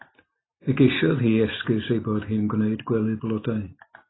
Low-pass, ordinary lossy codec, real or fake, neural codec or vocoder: 7.2 kHz; AAC, 16 kbps; real; none